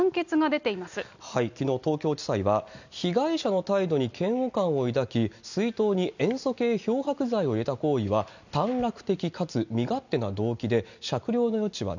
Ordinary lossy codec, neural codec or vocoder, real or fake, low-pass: none; none; real; 7.2 kHz